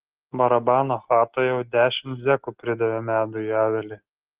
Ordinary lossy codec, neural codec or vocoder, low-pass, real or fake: Opus, 16 kbps; none; 3.6 kHz; real